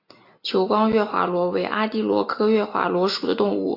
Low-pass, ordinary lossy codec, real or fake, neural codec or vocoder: 5.4 kHz; AAC, 32 kbps; real; none